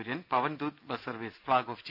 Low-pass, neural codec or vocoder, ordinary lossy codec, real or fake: 5.4 kHz; none; none; real